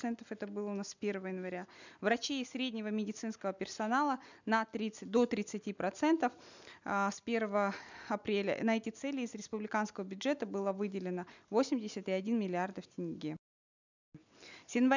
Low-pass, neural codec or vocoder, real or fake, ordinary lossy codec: 7.2 kHz; none; real; none